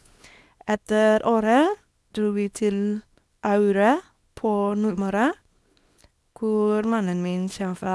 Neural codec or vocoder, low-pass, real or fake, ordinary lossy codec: codec, 24 kHz, 0.9 kbps, WavTokenizer, small release; none; fake; none